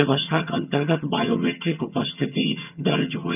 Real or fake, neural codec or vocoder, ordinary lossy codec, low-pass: fake; vocoder, 22.05 kHz, 80 mel bands, HiFi-GAN; none; 3.6 kHz